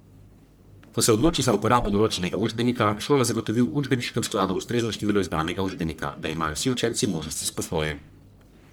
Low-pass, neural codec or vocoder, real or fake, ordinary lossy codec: none; codec, 44.1 kHz, 1.7 kbps, Pupu-Codec; fake; none